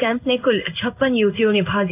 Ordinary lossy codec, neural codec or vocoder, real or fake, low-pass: none; codec, 16 kHz in and 24 kHz out, 1 kbps, XY-Tokenizer; fake; 3.6 kHz